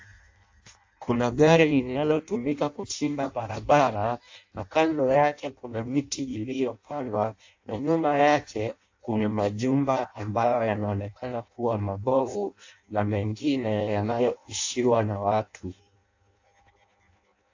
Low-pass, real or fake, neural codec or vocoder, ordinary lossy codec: 7.2 kHz; fake; codec, 16 kHz in and 24 kHz out, 0.6 kbps, FireRedTTS-2 codec; AAC, 48 kbps